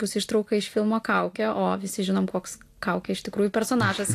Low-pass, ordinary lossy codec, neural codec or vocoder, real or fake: 14.4 kHz; AAC, 64 kbps; none; real